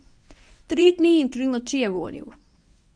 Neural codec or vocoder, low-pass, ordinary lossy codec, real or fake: codec, 24 kHz, 0.9 kbps, WavTokenizer, medium speech release version 1; 9.9 kHz; MP3, 96 kbps; fake